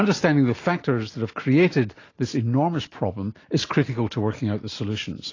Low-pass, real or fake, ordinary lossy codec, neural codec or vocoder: 7.2 kHz; real; AAC, 32 kbps; none